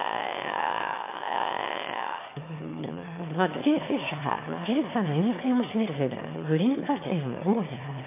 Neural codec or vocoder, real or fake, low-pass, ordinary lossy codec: autoencoder, 22.05 kHz, a latent of 192 numbers a frame, VITS, trained on one speaker; fake; 3.6 kHz; MP3, 24 kbps